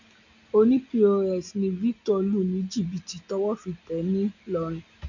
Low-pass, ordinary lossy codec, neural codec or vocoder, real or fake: 7.2 kHz; none; none; real